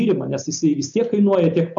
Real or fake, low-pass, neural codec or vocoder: real; 7.2 kHz; none